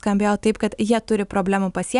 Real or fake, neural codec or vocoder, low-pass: real; none; 10.8 kHz